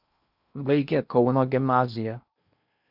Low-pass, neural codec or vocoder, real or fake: 5.4 kHz; codec, 16 kHz in and 24 kHz out, 0.8 kbps, FocalCodec, streaming, 65536 codes; fake